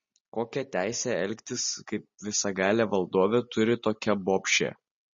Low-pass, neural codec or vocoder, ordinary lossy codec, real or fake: 7.2 kHz; none; MP3, 32 kbps; real